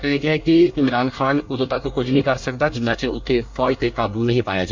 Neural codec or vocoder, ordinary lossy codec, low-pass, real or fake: codec, 24 kHz, 1 kbps, SNAC; MP3, 64 kbps; 7.2 kHz; fake